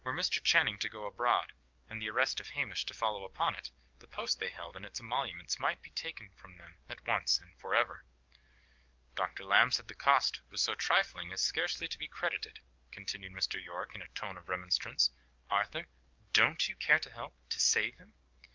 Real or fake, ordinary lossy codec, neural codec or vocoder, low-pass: fake; Opus, 24 kbps; codec, 44.1 kHz, 7.8 kbps, DAC; 7.2 kHz